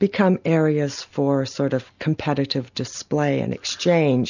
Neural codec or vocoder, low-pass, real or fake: none; 7.2 kHz; real